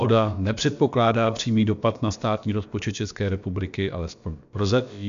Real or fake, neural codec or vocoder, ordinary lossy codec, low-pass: fake; codec, 16 kHz, about 1 kbps, DyCAST, with the encoder's durations; MP3, 64 kbps; 7.2 kHz